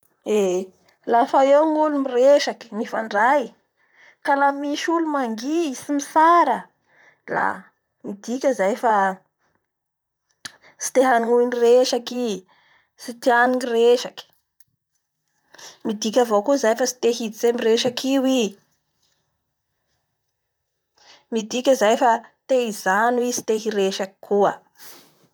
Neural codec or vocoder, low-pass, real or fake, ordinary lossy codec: vocoder, 44.1 kHz, 128 mel bands, Pupu-Vocoder; none; fake; none